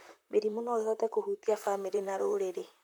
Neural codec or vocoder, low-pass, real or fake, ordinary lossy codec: vocoder, 44.1 kHz, 128 mel bands, Pupu-Vocoder; none; fake; none